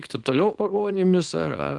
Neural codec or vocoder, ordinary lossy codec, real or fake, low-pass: codec, 24 kHz, 0.9 kbps, WavTokenizer, small release; Opus, 24 kbps; fake; 10.8 kHz